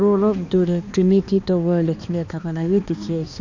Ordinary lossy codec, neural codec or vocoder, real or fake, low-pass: none; codec, 16 kHz, 2 kbps, X-Codec, HuBERT features, trained on balanced general audio; fake; 7.2 kHz